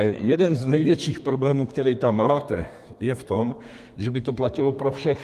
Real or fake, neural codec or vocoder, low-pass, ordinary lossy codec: fake; codec, 32 kHz, 1.9 kbps, SNAC; 14.4 kHz; Opus, 32 kbps